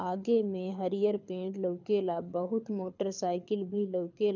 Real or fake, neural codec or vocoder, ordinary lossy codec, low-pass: fake; codec, 24 kHz, 6 kbps, HILCodec; none; 7.2 kHz